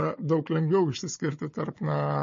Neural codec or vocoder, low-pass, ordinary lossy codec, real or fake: codec, 16 kHz, 4 kbps, FunCodec, trained on Chinese and English, 50 frames a second; 7.2 kHz; MP3, 32 kbps; fake